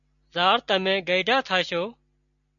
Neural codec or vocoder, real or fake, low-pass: none; real; 7.2 kHz